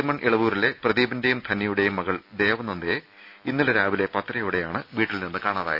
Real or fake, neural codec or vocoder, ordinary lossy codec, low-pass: real; none; none; 5.4 kHz